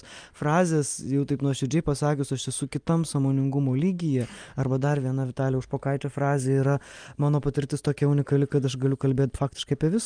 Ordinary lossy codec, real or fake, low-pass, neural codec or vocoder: Opus, 32 kbps; real; 9.9 kHz; none